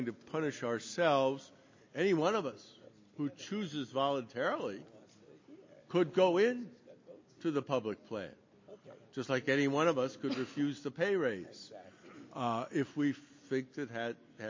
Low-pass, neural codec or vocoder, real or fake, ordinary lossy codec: 7.2 kHz; none; real; MP3, 32 kbps